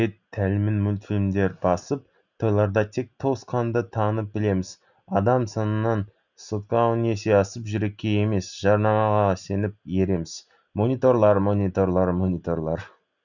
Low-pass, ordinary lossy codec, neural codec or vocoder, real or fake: 7.2 kHz; none; none; real